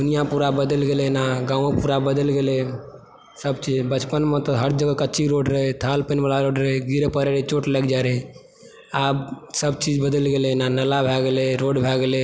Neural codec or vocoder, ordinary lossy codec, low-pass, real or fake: none; none; none; real